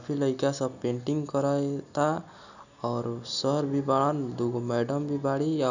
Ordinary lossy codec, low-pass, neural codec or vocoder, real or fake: none; 7.2 kHz; none; real